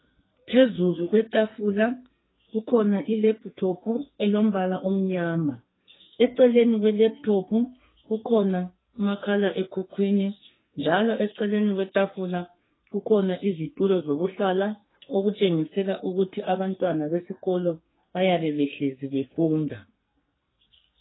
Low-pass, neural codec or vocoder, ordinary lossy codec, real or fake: 7.2 kHz; codec, 44.1 kHz, 2.6 kbps, SNAC; AAC, 16 kbps; fake